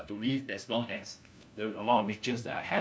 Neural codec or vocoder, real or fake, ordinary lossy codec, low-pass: codec, 16 kHz, 1 kbps, FunCodec, trained on LibriTTS, 50 frames a second; fake; none; none